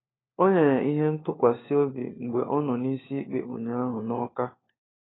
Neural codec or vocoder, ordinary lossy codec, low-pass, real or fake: codec, 16 kHz, 4 kbps, FunCodec, trained on LibriTTS, 50 frames a second; AAC, 16 kbps; 7.2 kHz; fake